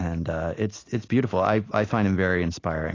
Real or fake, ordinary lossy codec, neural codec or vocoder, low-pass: real; AAC, 32 kbps; none; 7.2 kHz